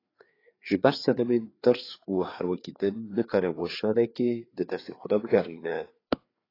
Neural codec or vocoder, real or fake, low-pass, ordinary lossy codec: codec, 16 kHz, 4 kbps, FreqCodec, larger model; fake; 5.4 kHz; AAC, 24 kbps